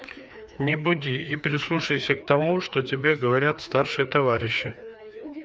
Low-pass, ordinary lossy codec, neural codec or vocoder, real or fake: none; none; codec, 16 kHz, 2 kbps, FreqCodec, larger model; fake